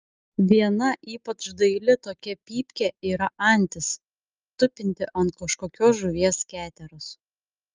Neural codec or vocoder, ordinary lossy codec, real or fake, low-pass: none; Opus, 24 kbps; real; 7.2 kHz